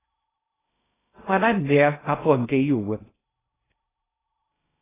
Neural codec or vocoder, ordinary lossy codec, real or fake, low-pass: codec, 16 kHz in and 24 kHz out, 0.6 kbps, FocalCodec, streaming, 2048 codes; AAC, 16 kbps; fake; 3.6 kHz